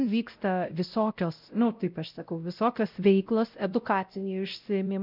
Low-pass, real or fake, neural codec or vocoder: 5.4 kHz; fake; codec, 16 kHz, 0.5 kbps, X-Codec, WavLM features, trained on Multilingual LibriSpeech